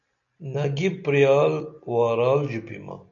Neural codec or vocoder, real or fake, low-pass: none; real; 7.2 kHz